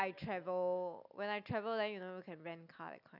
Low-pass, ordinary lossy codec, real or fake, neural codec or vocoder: 5.4 kHz; none; real; none